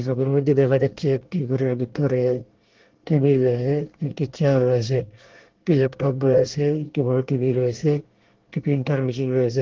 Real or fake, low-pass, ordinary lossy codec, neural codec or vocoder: fake; 7.2 kHz; Opus, 16 kbps; codec, 24 kHz, 1 kbps, SNAC